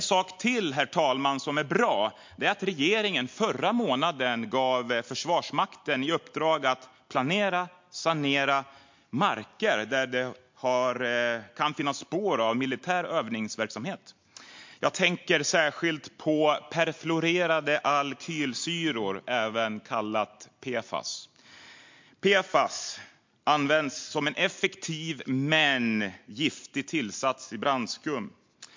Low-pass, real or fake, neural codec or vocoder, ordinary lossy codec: 7.2 kHz; real; none; MP3, 48 kbps